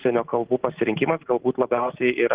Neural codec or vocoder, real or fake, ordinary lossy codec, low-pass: none; real; Opus, 16 kbps; 3.6 kHz